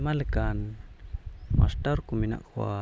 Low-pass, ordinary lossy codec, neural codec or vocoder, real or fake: none; none; none; real